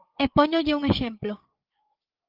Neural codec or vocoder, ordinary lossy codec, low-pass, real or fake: codec, 16 kHz, 8 kbps, FreqCodec, larger model; Opus, 32 kbps; 5.4 kHz; fake